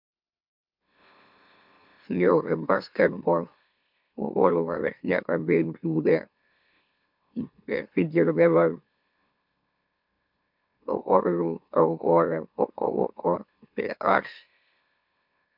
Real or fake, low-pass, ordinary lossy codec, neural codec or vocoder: fake; 5.4 kHz; MP3, 48 kbps; autoencoder, 44.1 kHz, a latent of 192 numbers a frame, MeloTTS